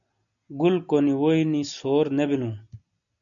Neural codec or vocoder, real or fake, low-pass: none; real; 7.2 kHz